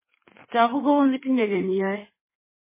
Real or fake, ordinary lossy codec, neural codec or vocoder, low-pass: fake; MP3, 16 kbps; codec, 16 kHz in and 24 kHz out, 1.1 kbps, FireRedTTS-2 codec; 3.6 kHz